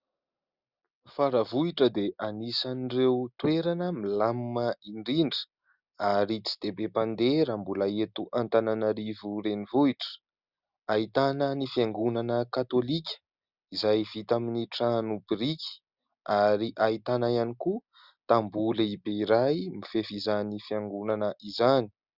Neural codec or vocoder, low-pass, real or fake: none; 5.4 kHz; real